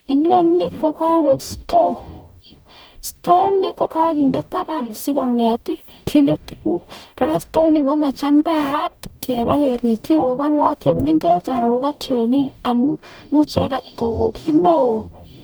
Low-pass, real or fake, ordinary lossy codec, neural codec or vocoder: none; fake; none; codec, 44.1 kHz, 0.9 kbps, DAC